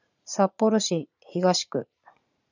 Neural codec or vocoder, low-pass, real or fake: vocoder, 44.1 kHz, 80 mel bands, Vocos; 7.2 kHz; fake